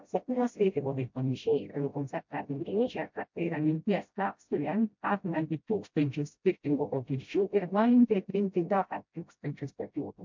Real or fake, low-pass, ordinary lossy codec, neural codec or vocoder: fake; 7.2 kHz; MP3, 48 kbps; codec, 16 kHz, 0.5 kbps, FreqCodec, smaller model